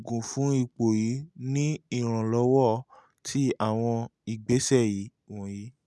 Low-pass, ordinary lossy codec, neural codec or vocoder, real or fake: none; none; none; real